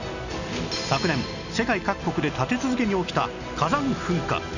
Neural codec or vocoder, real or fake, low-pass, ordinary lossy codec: none; real; 7.2 kHz; none